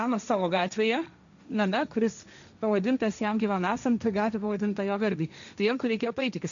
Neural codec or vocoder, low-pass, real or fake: codec, 16 kHz, 1.1 kbps, Voila-Tokenizer; 7.2 kHz; fake